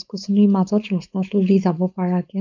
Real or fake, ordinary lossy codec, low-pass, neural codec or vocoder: fake; none; 7.2 kHz; codec, 16 kHz, 4.8 kbps, FACodec